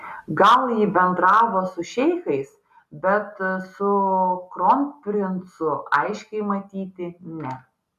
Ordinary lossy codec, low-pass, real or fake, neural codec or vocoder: MP3, 96 kbps; 14.4 kHz; real; none